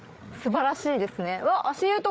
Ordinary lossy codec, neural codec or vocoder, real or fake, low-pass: none; codec, 16 kHz, 8 kbps, FreqCodec, larger model; fake; none